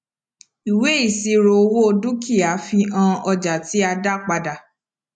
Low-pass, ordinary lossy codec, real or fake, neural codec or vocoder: none; none; real; none